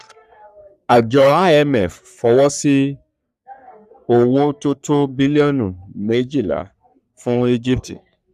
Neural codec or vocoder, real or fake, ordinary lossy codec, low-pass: codec, 44.1 kHz, 3.4 kbps, Pupu-Codec; fake; none; 14.4 kHz